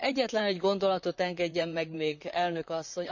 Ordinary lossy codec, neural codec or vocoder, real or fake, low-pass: none; vocoder, 44.1 kHz, 128 mel bands, Pupu-Vocoder; fake; 7.2 kHz